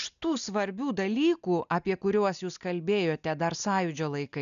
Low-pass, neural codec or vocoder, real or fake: 7.2 kHz; none; real